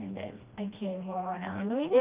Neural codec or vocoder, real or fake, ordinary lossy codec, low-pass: codec, 16 kHz, 1 kbps, FreqCodec, smaller model; fake; Opus, 24 kbps; 3.6 kHz